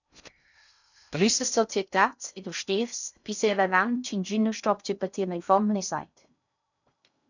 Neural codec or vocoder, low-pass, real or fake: codec, 16 kHz in and 24 kHz out, 0.6 kbps, FocalCodec, streaming, 2048 codes; 7.2 kHz; fake